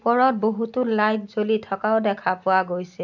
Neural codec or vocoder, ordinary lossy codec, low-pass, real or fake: none; none; 7.2 kHz; real